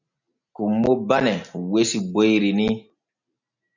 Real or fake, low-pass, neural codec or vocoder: real; 7.2 kHz; none